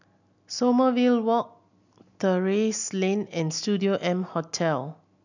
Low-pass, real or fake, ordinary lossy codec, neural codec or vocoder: 7.2 kHz; real; none; none